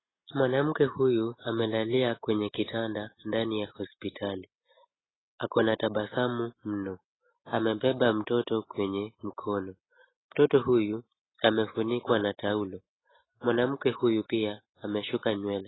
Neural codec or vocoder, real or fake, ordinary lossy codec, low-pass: none; real; AAC, 16 kbps; 7.2 kHz